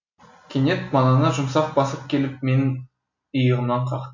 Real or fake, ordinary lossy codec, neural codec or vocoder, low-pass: real; MP3, 64 kbps; none; 7.2 kHz